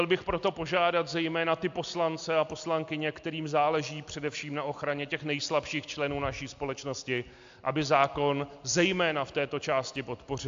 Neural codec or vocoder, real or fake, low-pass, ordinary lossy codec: none; real; 7.2 kHz; AAC, 64 kbps